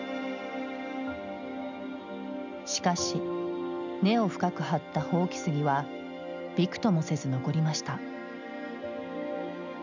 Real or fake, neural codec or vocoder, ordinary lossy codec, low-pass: real; none; none; 7.2 kHz